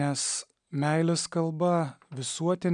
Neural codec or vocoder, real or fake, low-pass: none; real; 9.9 kHz